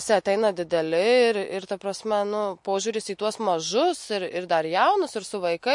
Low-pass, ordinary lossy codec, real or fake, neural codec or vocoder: 10.8 kHz; MP3, 48 kbps; real; none